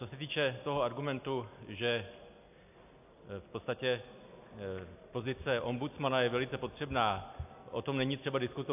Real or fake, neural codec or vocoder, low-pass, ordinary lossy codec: real; none; 3.6 kHz; AAC, 32 kbps